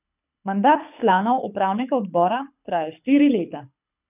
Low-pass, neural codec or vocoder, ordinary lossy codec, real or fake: 3.6 kHz; codec, 24 kHz, 6 kbps, HILCodec; none; fake